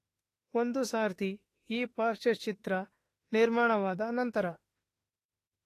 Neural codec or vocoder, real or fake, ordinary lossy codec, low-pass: autoencoder, 48 kHz, 32 numbers a frame, DAC-VAE, trained on Japanese speech; fake; AAC, 48 kbps; 14.4 kHz